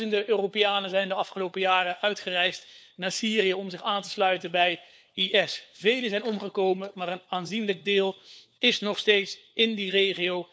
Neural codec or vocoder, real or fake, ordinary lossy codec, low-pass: codec, 16 kHz, 4 kbps, FunCodec, trained on LibriTTS, 50 frames a second; fake; none; none